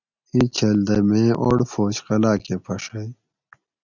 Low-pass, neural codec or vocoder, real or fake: 7.2 kHz; none; real